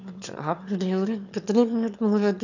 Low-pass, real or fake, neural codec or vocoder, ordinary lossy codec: 7.2 kHz; fake; autoencoder, 22.05 kHz, a latent of 192 numbers a frame, VITS, trained on one speaker; none